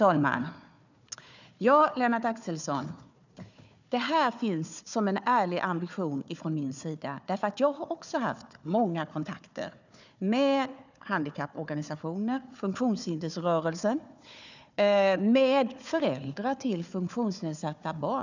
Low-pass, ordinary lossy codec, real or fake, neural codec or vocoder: 7.2 kHz; none; fake; codec, 16 kHz, 4 kbps, FunCodec, trained on Chinese and English, 50 frames a second